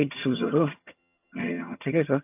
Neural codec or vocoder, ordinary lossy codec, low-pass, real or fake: vocoder, 22.05 kHz, 80 mel bands, HiFi-GAN; AAC, 32 kbps; 3.6 kHz; fake